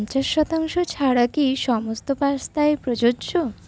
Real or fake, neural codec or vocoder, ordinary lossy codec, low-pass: real; none; none; none